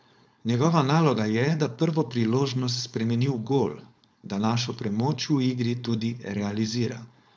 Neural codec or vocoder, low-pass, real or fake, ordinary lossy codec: codec, 16 kHz, 4.8 kbps, FACodec; none; fake; none